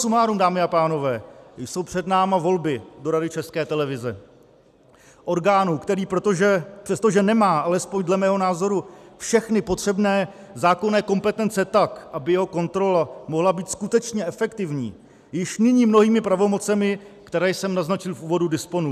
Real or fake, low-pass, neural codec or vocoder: real; 14.4 kHz; none